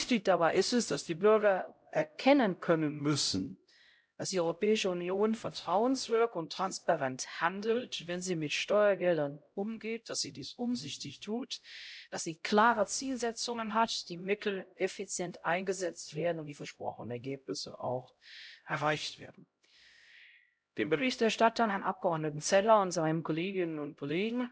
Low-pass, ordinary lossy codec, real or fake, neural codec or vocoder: none; none; fake; codec, 16 kHz, 0.5 kbps, X-Codec, HuBERT features, trained on LibriSpeech